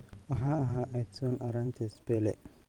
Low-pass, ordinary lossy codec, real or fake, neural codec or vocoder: 19.8 kHz; Opus, 32 kbps; fake; vocoder, 44.1 kHz, 128 mel bands every 512 samples, BigVGAN v2